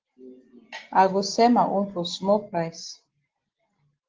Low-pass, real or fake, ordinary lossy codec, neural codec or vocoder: 7.2 kHz; real; Opus, 16 kbps; none